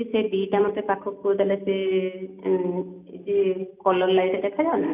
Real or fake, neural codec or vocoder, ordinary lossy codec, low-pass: real; none; none; 3.6 kHz